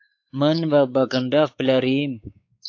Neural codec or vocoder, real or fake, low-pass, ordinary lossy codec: codec, 16 kHz, 4 kbps, X-Codec, WavLM features, trained on Multilingual LibriSpeech; fake; 7.2 kHz; AAC, 48 kbps